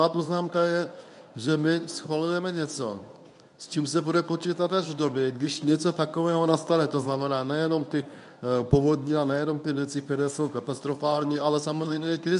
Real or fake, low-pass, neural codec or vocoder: fake; 10.8 kHz; codec, 24 kHz, 0.9 kbps, WavTokenizer, medium speech release version 1